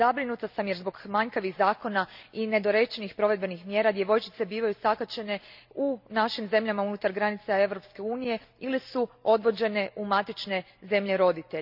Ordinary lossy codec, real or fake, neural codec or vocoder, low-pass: none; real; none; 5.4 kHz